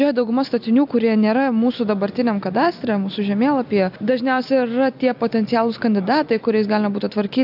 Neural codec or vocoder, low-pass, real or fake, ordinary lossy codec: none; 5.4 kHz; real; AAC, 48 kbps